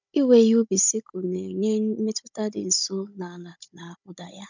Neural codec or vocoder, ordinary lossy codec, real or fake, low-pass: codec, 16 kHz, 16 kbps, FunCodec, trained on Chinese and English, 50 frames a second; none; fake; 7.2 kHz